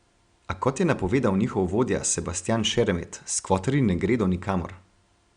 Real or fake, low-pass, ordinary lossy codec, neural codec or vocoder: real; 9.9 kHz; Opus, 64 kbps; none